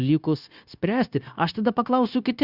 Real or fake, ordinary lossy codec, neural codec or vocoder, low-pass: fake; Opus, 64 kbps; codec, 16 kHz, 0.9 kbps, LongCat-Audio-Codec; 5.4 kHz